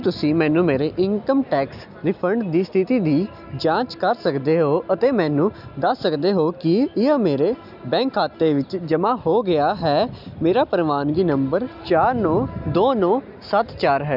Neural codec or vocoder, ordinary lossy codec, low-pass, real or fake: none; none; 5.4 kHz; real